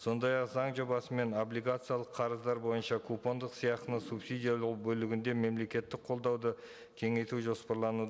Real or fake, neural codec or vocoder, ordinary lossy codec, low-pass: real; none; none; none